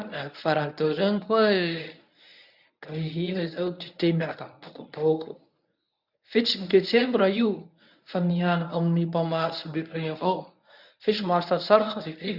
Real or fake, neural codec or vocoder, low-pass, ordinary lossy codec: fake; codec, 24 kHz, 0.9 kbps, WavTokenizer, medium speech release version 1; 5.4 kHz; none